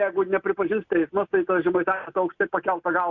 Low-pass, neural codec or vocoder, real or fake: 7.2 kHz; none; real